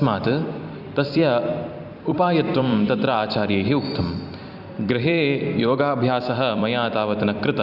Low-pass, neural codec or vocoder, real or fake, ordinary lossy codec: 5.4 kHz; vocoder, 44.1 kHz, 128 mel bands every 256 samples, BigVGAN v2; fake; Opus, 64 kbps